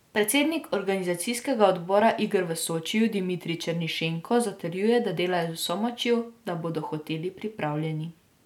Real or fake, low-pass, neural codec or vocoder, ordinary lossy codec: real; 19.8 kHz; none; none